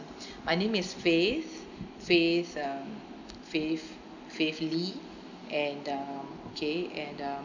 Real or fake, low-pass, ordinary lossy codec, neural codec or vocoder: real; 7.2 kHz; none; none